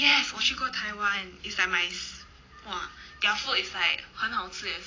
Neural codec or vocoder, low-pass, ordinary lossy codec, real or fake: none; 7.2 kHz; AAC, 32 kbps; real